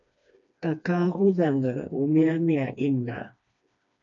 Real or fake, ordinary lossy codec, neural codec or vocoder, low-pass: fake; MP3, 96 kbps; codec, 16 kHz, 2 kbps, FreqCodec, smaller model; 7.2 kHz